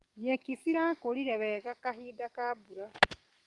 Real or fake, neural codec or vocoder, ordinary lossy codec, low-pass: fake; codec, 44.1 kHz, 7.8 kbps, DAC; Opus, 24 kbps; 10.8 kHz